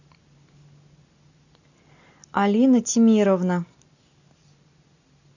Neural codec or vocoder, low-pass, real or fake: none; 7.2 kHz; real